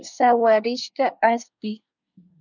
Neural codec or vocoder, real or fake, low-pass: codec, 24 kHz, 1 kbps, SNAC; fake; 7.2 kHz